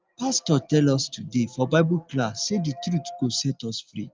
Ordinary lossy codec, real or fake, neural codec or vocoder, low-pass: Opus, 24 kbps; real; none; 7.2 kHz